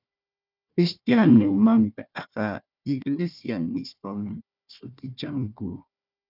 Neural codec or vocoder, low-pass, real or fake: codec, 16 kHz, 1 kbps, FunCodec, trained on Chinese and English, 50 frames a second; 5.4 kHz; fake